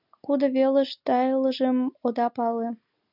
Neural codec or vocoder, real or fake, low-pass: none; real; 5.4 kHz